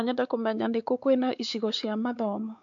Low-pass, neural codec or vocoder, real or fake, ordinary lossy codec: 7.2 kHz; codec, 16 kHz, 4 kbps, X-Codec, WavLM features, trained on Multilingual LibriSpeech; fake; AAC, 64 kbps